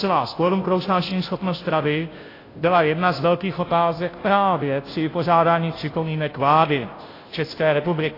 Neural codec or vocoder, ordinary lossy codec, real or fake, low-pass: codec, 16 kHz, 0.5 kbps, FunCodec, trained on Chinese and English, 25 frames a second; AAC, 24 kbps; fake; 5.4 kHz